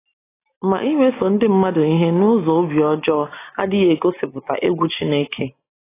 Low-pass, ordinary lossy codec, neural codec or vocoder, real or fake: 3.6 kHz; AAC, 16 kbps; none; real